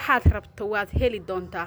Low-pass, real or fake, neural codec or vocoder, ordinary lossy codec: none; real; none; none